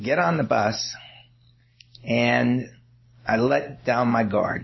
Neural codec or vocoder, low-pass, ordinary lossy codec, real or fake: none; 7.2 kHz; MP3, 24 kbps; real